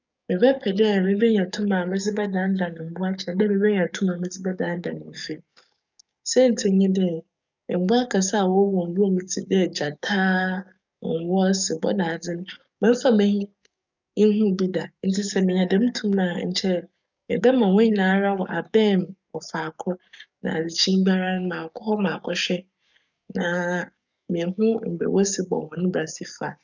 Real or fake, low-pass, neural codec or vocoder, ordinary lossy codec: fake; 7.2 kHz; codec, 44.1 kHz, 7.8 kbps, DAC; none